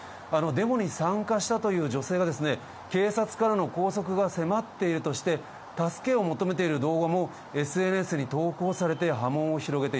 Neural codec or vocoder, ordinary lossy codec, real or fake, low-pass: none; none; real; none